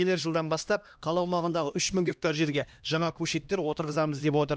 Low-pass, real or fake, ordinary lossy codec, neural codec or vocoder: none; fake; none; codec, 16 kHz, 1 kbps, X-Codec, HuBERT features, trained on LibriSpeech